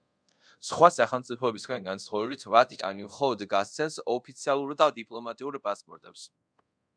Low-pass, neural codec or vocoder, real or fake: 9.9 kHz; codec, 24 kHz, 0.5 kbps, DualCodec; fake